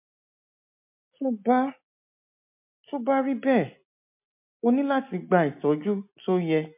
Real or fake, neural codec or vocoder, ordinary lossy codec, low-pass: real; none; MP3, 32 kbps; 3.6 kHz